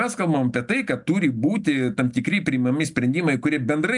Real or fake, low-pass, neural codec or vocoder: real; 10.8 kHz; none